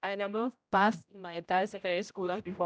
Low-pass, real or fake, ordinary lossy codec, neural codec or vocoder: none; fake; none; codec, 16 kHz, 0.5 kbps, X-Codec, HuBERT features, trained on general audio